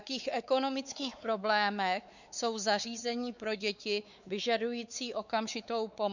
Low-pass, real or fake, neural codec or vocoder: 7.2 kHz; fake; codec, 16 kHz, 4 kbps, X-Codec, WavLM features, trained on Multilingual LibriSpeech